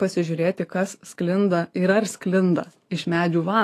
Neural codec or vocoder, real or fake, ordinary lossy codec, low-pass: autoencoder, 48 kHz, 128 numbers a frame, DAC-VAE, trained on Japanese speech; fake; AAC, 48 kbps; 14.4 kHz